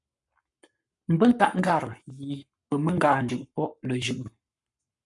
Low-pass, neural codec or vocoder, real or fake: 10.8 kHz; codec, 44.1 kHz, 7.8 kbps, Pupu-Codec; fake